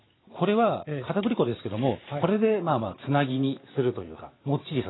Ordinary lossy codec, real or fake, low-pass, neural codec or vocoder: AAC, 16 kbps; fake; 7.2 kHz; vocoder, 44.1 kHz, 128 mel bands every 512 samples, BigVGAN v2